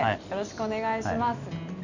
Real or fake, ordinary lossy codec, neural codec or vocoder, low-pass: real; none; none; 7.2 kHz